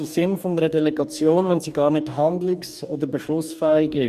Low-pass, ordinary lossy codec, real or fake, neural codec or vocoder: 14.4 kHz; none; fake; codec, 44.1 kHz, 2.6 kbps, DAC